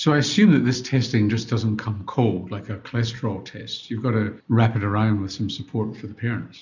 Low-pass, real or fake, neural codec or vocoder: 7.2 kHz; real; none